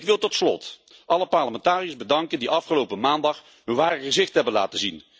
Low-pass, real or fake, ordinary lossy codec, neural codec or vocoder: none; real; none; none